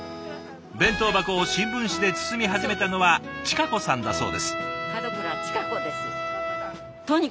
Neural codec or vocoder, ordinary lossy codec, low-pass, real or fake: none; none; none; real